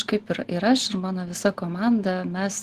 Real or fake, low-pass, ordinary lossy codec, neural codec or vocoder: real; 14.4 kHz; Opus, 16 kbps; none